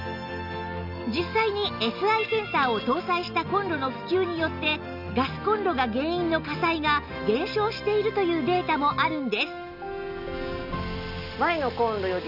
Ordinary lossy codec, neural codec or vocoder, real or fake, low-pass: none; none; real; 5.4 kHz